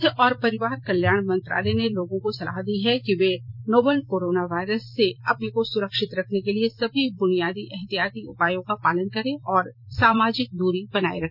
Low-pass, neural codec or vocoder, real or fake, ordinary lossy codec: 5.4 kHz; vocoder, 44.1 kHz, 128 mel bands every 256 samples, BigVGAN v2; fake; none